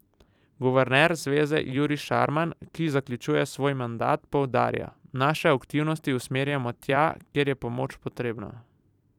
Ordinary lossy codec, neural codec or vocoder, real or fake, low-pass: none; vocoder, 44.1 kHz, 128 mel bands every 256 samples, BigVGAN v2; fake; 19.8 kHz